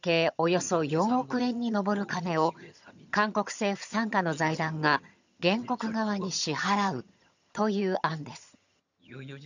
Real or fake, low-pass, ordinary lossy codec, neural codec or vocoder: fake; 7.2 kHz; none; vocoder, 22.05 kHz, 80 mel bands, HiFi-GAN